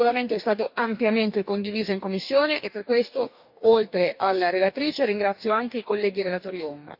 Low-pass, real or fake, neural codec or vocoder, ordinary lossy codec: 5.4 kHz; fake; codec, 44.1 kHz, 2.6 kbps, DAC; none